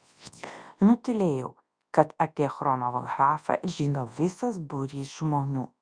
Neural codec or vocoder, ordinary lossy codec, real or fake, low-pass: codec, 24 kHz, 0.9 kbps, WavTokenizer, large speech release; Opus, 64 kbps; fake; 9.9 kHz